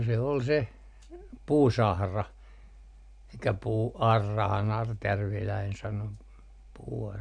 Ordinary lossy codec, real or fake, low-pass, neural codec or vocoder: MP3, 96 kbps; real; 9.9 kHz; none